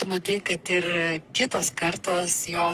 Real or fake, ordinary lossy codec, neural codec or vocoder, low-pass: fake; Opus, 32 kbps; codec, 44.1 kHz, 3.4 kbps, Pupu-Codec; 14.4 kHz